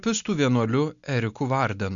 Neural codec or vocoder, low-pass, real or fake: none; 7.2 kHz; real